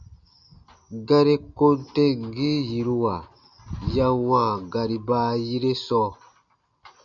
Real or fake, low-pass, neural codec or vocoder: real; 7.2 kHz; none